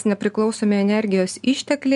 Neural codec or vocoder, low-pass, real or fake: none; 10.8 kHz; real